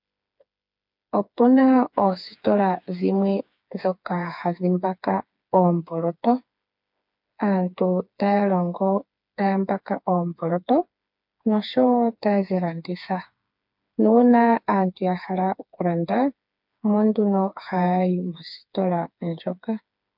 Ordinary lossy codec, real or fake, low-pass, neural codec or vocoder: MP3, 48 kbps; fake; 5.4 kHz; codec, 16 kHz, 4 kbps, FreqCodec, smaller model